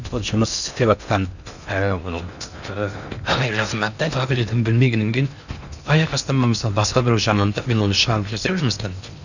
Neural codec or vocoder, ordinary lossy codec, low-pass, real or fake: codec, 16 kHz in and 24 kHz out, 0.6 kbps, FocalCodec, streaming, 4096 codes; none; 7.2 kHz; fake